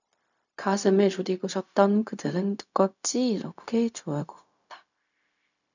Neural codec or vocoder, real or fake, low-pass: codec, 16 kHz, 0.4 kbps, LongCat-Audio-Codec; fake; 7.2 kHz